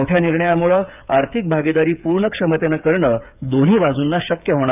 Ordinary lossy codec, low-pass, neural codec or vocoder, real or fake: none; 3.6 kHz; codec, 16 kHz, 8 kbps, FreqCodec, smaller model; fake